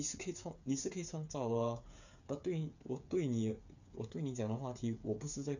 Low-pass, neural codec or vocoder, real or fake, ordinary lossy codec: 7.2 kHz; codec, 16 kHz, 8 kbps, FreqCodec, smaller model; fake; none